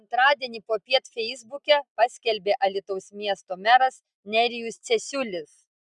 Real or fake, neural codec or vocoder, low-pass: real; none; 10.8 kHz